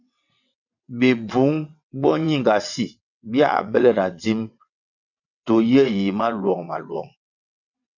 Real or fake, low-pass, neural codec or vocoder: fake; 7.2 kHz; vocoder, 22.05 kHz, 80 mel bands, WaveNeXt